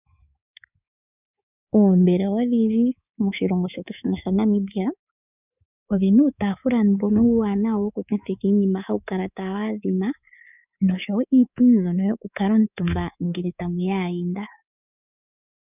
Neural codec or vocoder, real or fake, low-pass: codec, 24 kHz, 3.1 kbps, DualCodec; fake; 3.6 kHz